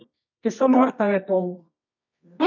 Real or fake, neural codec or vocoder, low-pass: fake; codec, 24 kHz, 0.9 kbps, WavTokenizer, medium music audio release; 7.2 kHz